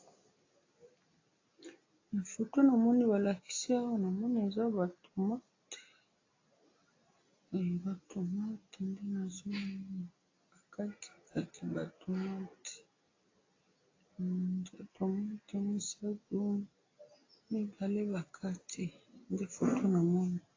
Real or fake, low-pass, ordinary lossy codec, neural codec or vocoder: real; 7.2 kHz; AAC, 32 kbps; none